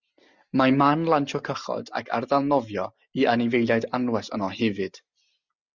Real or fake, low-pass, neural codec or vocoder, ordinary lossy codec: real; 7.2 kHz; none; Opus, 64 kbps